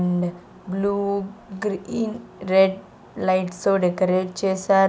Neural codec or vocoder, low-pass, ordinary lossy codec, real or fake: none; none; none; real